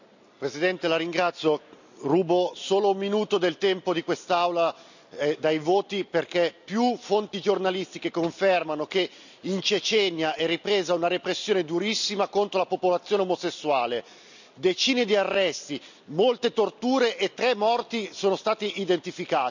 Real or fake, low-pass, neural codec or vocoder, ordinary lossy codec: real; 7.2 kHz; none; none